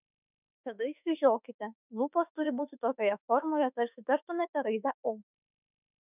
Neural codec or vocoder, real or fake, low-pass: autoencoder, 48 kHz, 32 numbers a frame, DAC-VAE, trained on Japanese speech; fake; 3.6 kHz